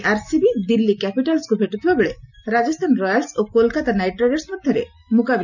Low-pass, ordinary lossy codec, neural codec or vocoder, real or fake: 7.2 kHz; none; none; real